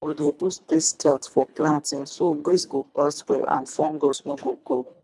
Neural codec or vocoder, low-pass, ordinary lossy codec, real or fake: codec, 24 kHz, 1.5 kbps, HILCodec; none; none; fake